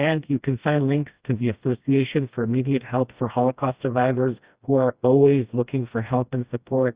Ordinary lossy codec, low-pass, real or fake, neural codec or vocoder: Opus, 64 kbps; 3.6 kHz; fake; codec, 16 kHz, 1 kbps, FreqCodec, smaller model